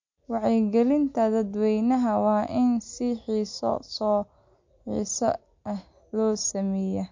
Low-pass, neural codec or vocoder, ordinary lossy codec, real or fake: 7.2 kHz; none; MP3, 48 kbps; real